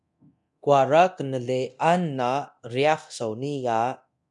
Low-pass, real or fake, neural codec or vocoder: 10.8 kHz; fake; codec, 24 kHz, 0.9 kbps, DualCodec